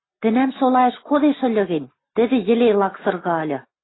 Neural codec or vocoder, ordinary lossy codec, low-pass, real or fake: none; AAC, 16 kbps; 7.2 kHz; real